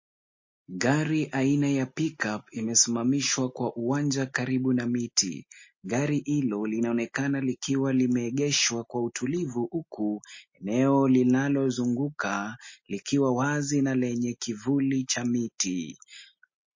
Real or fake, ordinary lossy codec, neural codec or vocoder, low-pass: real; MP3, 32 kbps; none; 7.2 kHz